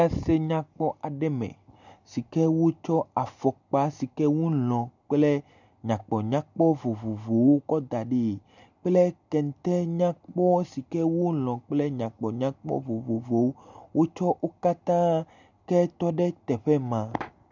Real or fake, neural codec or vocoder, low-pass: real; none; 7.2 kHz